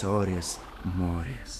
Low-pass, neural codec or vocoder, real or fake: 14.4 kHz; autoencoder, 48 kHz, 128 numbers a frame, DAC-VAE, trained on Japanese speech; fake